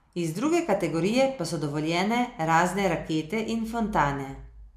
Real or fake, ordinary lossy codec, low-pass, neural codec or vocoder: real; MP3, 96 kbps; 14.4 kHz; none